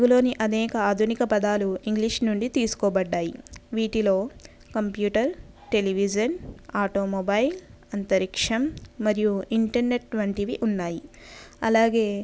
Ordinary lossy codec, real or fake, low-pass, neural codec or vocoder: none; real; none; none